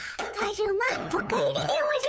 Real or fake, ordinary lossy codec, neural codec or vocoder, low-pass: fake; none; codec, 16 kHz, 8 kbps, FunCodec, trained on LibriTTS, 25 frames a second; none